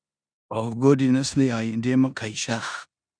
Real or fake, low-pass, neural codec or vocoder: fake; 9.9 kHz; codec, 16 kHz in and 24 kHz out, 0.9 kbps, LongCat-Audio-Codec, four codebook decoder